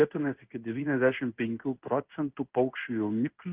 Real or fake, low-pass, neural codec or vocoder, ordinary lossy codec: fake; 3.6 kHz; codec, 16 kHz in and 24 kHz out, 1 kbps, XY-Tokenizer; Opus, 32 kbps